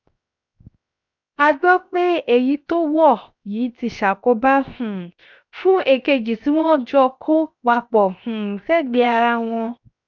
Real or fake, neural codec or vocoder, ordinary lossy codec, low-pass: fake; codec, 16 kHz, 0.7 kbps, FocalCodec; none; 7.2 kHz